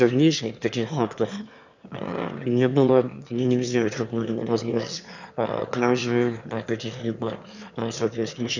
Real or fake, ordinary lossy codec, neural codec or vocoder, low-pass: fake; none; autoencoder, 22.05 kHz, a latent of 192 numbers a frame, VITS, trained on one speaker; 7.2 kHz